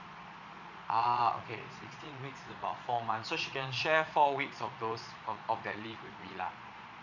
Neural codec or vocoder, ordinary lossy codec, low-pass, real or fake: vocoder, 22.05 kHz, 80 mel bands, Vocos; none; 7.2 kHz; fake